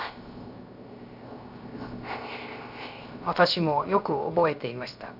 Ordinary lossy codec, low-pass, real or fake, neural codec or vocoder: none; 5.4 kHz; fake; codec, 16 kHz, 0.3 kbps, FocalCodec